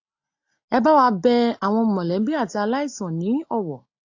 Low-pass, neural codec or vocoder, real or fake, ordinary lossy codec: 7.2 kHz; none; real; AAC, 48 kbps